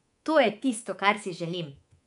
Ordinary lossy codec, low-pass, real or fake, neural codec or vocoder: none; 10.8 kHz; fake; codec, 24 kHz, 3.1 kbps, DualCodec